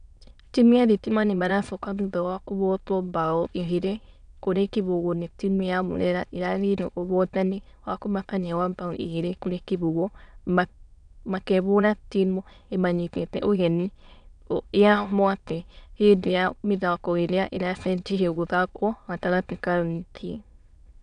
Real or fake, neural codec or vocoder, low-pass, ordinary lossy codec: fake; autoencoder, 22.05 kHz, a latent of 192 numbers a frame, VITS, trained on many speakers; 9.9 kHz; none